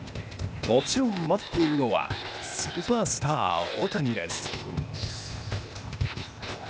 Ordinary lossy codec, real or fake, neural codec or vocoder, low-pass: none; fake; codec, 16 kHz, 0.8 kbps, ZipCodec; none